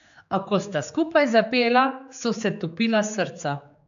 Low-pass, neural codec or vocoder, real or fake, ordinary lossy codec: 7.2 kHz; codec, 16 kHz, 4 kbps, X-Codec, HuBERT features, trained on general audio; fake; AAC, 96 kbps